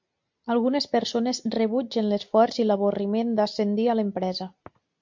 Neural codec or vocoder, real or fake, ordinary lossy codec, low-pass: none; real; MP3, 48 kbps; 7.2 kHz